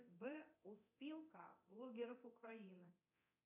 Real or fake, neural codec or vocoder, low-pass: fake; codec, 24 kHz, 0.9 kbps, DualCodec; 3.6 kHz